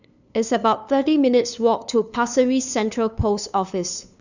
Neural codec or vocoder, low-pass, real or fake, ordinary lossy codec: codec, 16 kHz, 2 kbps, FunCodec, trained on LibriTTS, 25 frames a second; 7.2 kHz; fake; none